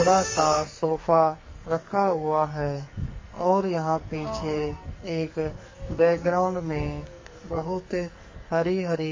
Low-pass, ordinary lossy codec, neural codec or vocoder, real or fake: 7.2 kHz; MP3, 32 kbps; codec, 44.1 kHz, 2.6 kbps, SNAC; fake